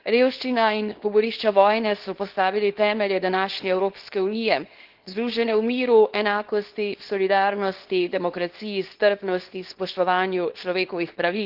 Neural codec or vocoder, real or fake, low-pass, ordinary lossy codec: codec, 24 kHz, 0.9 kbps, WavTokenizer, small release; fake; 5.4 kHz; Opus, 16 kbps